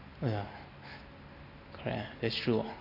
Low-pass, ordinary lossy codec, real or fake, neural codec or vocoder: 5.4 kHz; none; real; none